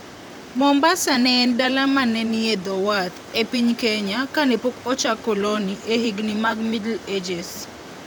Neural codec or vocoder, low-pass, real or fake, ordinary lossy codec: vocoder, 44.1 kHz, 128 mel bands, Pupu-Vocoder; none; fake; none